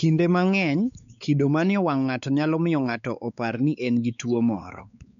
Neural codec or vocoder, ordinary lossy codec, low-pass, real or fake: codec, 16 kHz, 4 kbps, X-Codec, WavLM features, trained on Multilingual LibriSpeech; none; 7.2 kHz; fake